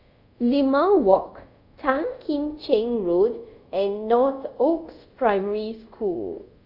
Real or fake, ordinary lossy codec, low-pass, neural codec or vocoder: fake; none; 5.4 kHz; codec, 24 kHz, 0.5 kbps, DualCodec